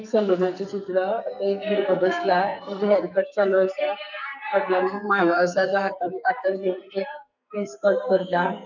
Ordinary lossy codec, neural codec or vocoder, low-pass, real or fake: none; codec, 44.1 kHz, 2.6 kbps, SNAC; 7.2 kHz; fake